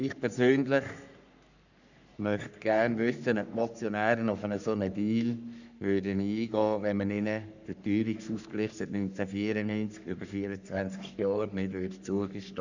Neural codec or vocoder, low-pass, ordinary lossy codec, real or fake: codec, 44.1 kHz, 3.4 kbps, Pupu-Codec; 7.2 kHz; none; fake